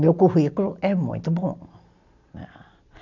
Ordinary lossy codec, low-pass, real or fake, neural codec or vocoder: none; 7.2 kHz; real; none